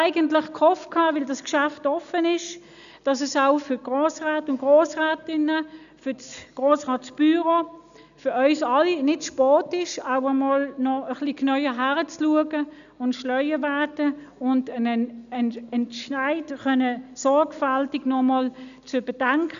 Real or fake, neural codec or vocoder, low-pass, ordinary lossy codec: real; none; 7.2 kHz; none